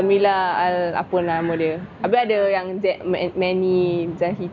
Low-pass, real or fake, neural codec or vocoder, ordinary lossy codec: 7.2 kHz; real; none; none